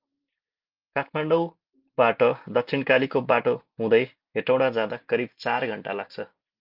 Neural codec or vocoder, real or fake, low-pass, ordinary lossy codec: none; real; 5.4 kHz; Opus, 24 kbps